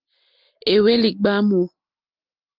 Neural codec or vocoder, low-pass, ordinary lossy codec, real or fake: none; 5.4 kHz; Opus, 24 kbps; real